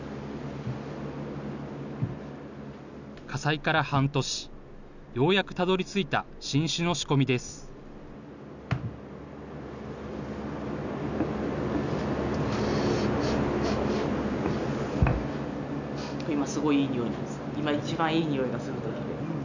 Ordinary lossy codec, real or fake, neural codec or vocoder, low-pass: none; real; none; 7.2 kHz